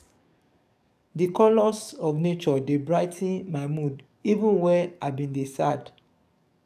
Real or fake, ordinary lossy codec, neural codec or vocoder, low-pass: fake; none; codec, 44.1 kHz, 7.8 kbps, DAC; 14.4 kHz